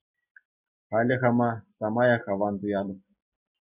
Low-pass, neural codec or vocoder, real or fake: 3.6 kHz; none; real